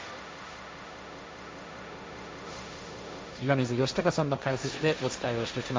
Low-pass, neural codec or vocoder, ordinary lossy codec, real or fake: none; codec, 16 kHz, 1.1 kbps, Voila-Tokenizer; none; fake